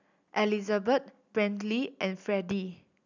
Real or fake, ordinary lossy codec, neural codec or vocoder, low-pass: real; none; none; 7.2 kHz